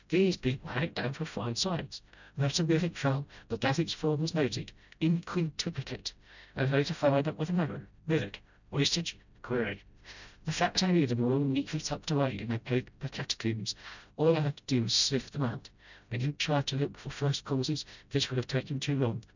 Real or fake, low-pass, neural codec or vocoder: fake; 7.2 kHz; codec, 16 kHz, 0.5 kbps, FreqCodec, smaller model